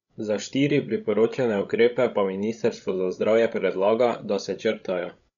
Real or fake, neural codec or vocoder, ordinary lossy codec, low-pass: fake; codec, 16 kHz, 16 kbps, FreqCodec, larger model; none; 7.2 kHz